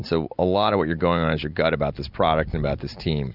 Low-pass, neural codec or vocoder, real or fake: 5.4 kHz; none; real